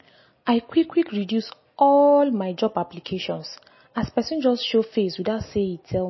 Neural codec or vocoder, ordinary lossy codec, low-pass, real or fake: none; MP3, 24 kbps; 7.2 kHz; real